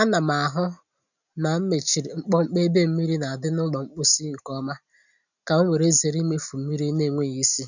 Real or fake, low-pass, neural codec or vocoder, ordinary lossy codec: real; 7.2 kHz; none; none